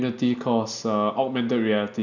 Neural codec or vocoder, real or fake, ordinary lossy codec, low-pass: none; real; none; 7.2 kHz